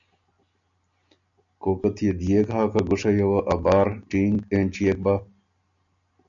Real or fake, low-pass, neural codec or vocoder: real; 7.2 kHz; none